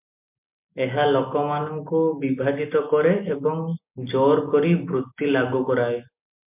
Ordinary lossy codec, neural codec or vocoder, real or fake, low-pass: MP3, 32 kbps; none; real; 3.6 kHz